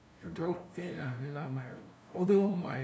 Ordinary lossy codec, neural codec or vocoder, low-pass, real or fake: none; codec, 16 kHz, 0.5 kbps, FunCodec, trained on LibriTTS, 25 frames a second; none; fake